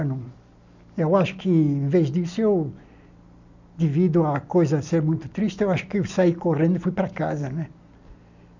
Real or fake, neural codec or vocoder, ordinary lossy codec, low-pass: real; none; none; 7.2 kHz